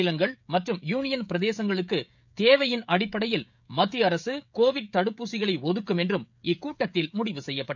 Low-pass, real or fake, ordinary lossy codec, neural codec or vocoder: 7.2 kHz; fake; none; codec, 16 kHz, 16 kbps, FreqCodec, smaller model